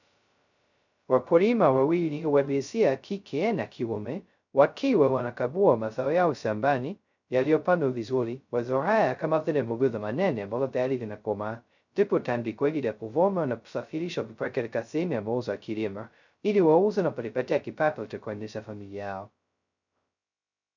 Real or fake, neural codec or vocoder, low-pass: fake; codec, 16 kHz, 0.2 kbps, FocalCodec; 7.2 kHz